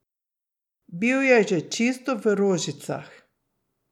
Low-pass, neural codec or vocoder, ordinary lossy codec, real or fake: 19.8 kHz; none; none; real